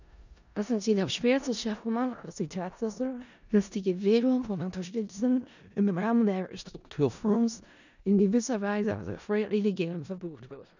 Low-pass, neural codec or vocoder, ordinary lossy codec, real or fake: 7.2 kHz; codec, 16 kHz in and 24 kHz out, 0.4 kbps, LongCat-Audio-Codec, four codebook decoder; none; fake